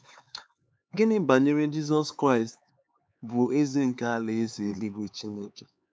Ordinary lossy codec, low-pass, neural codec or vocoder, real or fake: none; none; codec, 16 kHz, 4 kbps, X-Codec, HuBERT features, trained on LibriSpeech; fake